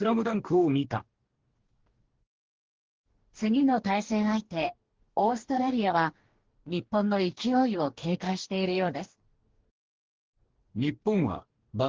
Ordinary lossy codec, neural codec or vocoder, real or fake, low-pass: Opus, 16 kbps; codec, 44.1 kHz, 2.6 kbps, DAC; fake; 7.2 kHz